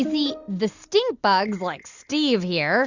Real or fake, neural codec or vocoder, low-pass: real; none; 7.2 kHz